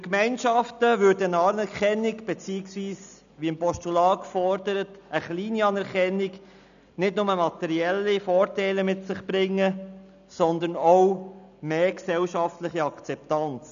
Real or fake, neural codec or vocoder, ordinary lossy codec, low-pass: real; none; none; 7.2 kHz